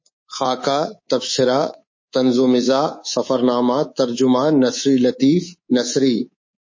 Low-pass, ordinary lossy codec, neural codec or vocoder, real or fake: 7.2 kHz; MP3, 32 kbps; codec, 24 kHz, 3.1 kbps, DualCodec; fake